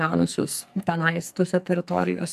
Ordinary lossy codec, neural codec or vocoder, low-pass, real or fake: AAC, 96 kbps; codec, 44.1 kHz, 2.6 kbps, SNAC; 14.4 kHz; fake